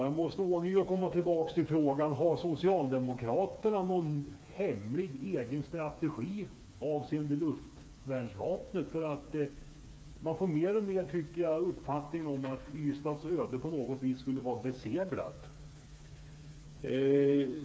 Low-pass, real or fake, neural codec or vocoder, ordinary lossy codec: none; fake; codec, 16 kHz, 4 kbps, FreqCodec, smaller model; none